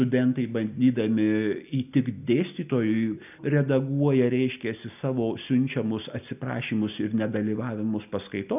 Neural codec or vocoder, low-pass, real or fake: none; 3.6 kHz; real